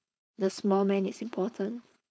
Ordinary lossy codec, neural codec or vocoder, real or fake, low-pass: none; codec, 16 kHz, 4.8 kbps, FACodec; fake; none